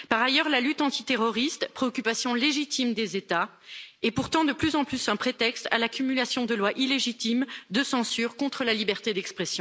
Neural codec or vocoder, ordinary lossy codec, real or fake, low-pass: none; none; real; none